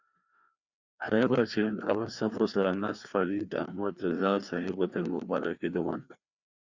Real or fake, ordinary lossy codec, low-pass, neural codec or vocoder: fake; Opus, 64 kbps; 7.2 kHz; codec, 16 kHz, 2 kbps, FreqCodec, larger model